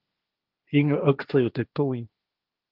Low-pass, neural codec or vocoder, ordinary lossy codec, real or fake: 5.4 kHz; codec, 16 kHz, 1.1 kbps, Voila-Tokenizer; Opus, 32 kbps; fake